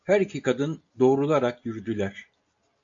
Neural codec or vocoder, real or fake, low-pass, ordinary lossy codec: none; real; 7.2 kHz; AAC, 64 kbps